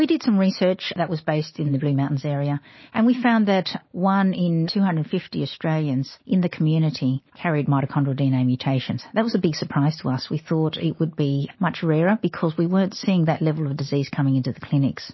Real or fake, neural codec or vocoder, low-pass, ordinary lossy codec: real; none; 7.2 kHz; MP3, 24 kbps